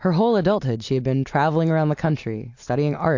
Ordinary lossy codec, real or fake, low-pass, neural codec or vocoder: AAC, 48 kbps; real; 7.2 kHz; none